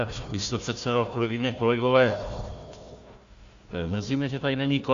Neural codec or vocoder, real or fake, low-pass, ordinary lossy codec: codec, 16 kHz, 1 kbps, FunCodec, trained on Chinese and English, 50 frames a second; fake; 7.2 kHz; Opus, 64 kbps